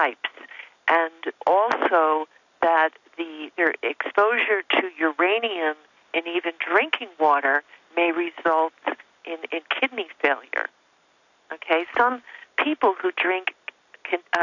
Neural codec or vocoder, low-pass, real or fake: none; 7.2 kHz; real